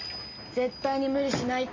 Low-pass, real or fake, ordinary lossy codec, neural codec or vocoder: 7.2 kHz; real; MP3, 32 kbps; none